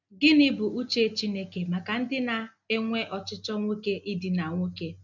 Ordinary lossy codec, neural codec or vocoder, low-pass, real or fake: none; none; 7.2 kHz; real